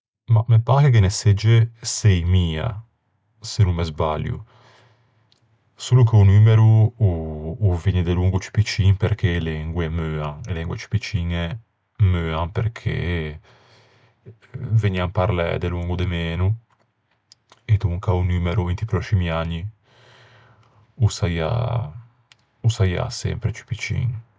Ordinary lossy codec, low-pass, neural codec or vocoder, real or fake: none; none; none; real